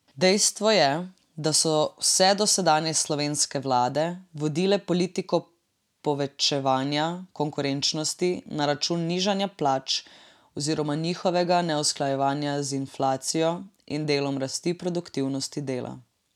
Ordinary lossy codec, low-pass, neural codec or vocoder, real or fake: none; 19.8 kHz; none; real